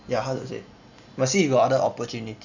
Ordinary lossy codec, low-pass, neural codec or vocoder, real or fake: none; 7.2 kHz; none; real